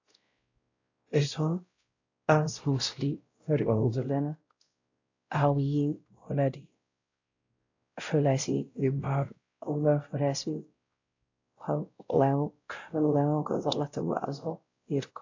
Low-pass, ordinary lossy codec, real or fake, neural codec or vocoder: 7.2 kHz; AAC, 48 kbps; fake; codec, 16 kHz, 0.5 kbps, X-Codec, WavLM features, trained on Multilingual LibriSpeech